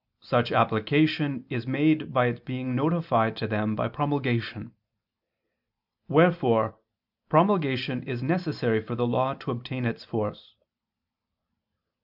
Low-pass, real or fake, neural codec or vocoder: 5.4 kHz; real; none